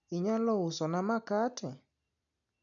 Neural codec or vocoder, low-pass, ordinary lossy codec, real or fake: none; 7.2 kHz; none; real